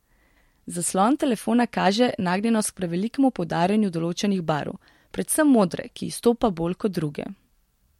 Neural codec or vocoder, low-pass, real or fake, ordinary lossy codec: none; 19.8 kHz; real; MP3, 64 kbps